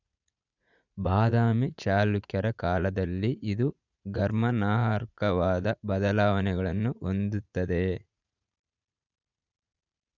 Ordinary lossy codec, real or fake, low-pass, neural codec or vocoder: none; fake; 7.2 kHz; vocoder, 44.1 kHz, 80 mel bands, Vocos